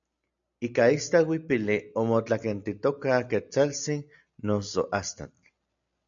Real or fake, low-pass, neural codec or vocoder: real; 7.2 kHz; none